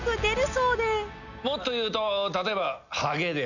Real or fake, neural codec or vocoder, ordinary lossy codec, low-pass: real; none; none; 7.2 kHz